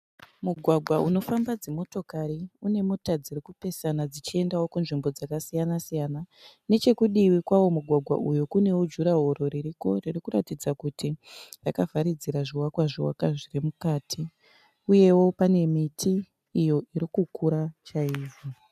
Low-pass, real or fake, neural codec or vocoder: 14.4 kHz; real; none